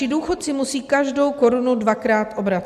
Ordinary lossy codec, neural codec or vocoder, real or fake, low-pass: AAC, 96 kbps; none; real; 14.4 kHz